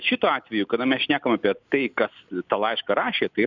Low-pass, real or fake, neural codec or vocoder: 7.2 kHz; real; none